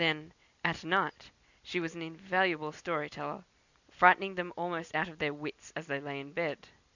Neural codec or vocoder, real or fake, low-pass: none; real; 7.2 kHz